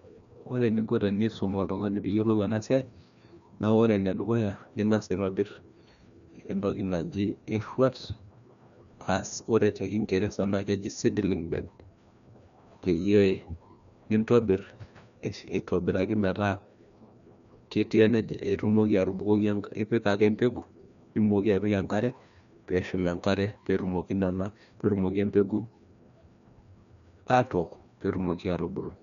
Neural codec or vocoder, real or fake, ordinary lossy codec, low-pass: codec, 16 kHz, 1 kbps, FreqCodec, larger model; fake; none; 7.2 kHz